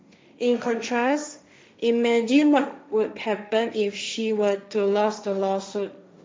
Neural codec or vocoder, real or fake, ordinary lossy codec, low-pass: codec, 16 kHz, 1.1 kbps, Voila-Tokenizer; fake; none; none